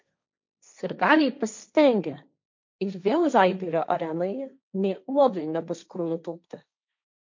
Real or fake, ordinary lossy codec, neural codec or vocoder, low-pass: fake; MP3, 64 kbps; codec, 16 kHz, 1.1 kbps, Voila-Tokenizer; 7.2 kHz